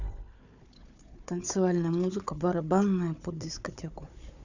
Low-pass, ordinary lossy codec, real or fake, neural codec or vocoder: 7.2 kHz; none; fake; codec, 16 kHz, 4 kbps, FunCodec, trained on Chinese and English, 50 frames a second